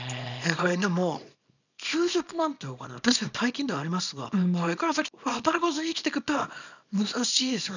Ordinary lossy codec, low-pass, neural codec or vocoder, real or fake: none; 7.2 kHz; codec, 24 kHz, 0.9 kbps, WavTokenizer, small release; fake